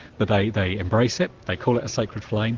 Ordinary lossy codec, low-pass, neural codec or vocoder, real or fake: Opus, 16 kbps; 7.2 kHz; none; real